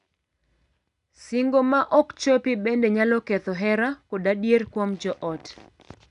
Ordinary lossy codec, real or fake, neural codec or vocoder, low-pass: none; real; none; 10.8 kHz